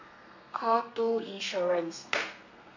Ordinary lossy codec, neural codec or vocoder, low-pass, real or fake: none; codec, 32 kHz, 1.9 kbps, SNAC; 7.2 kHz; fake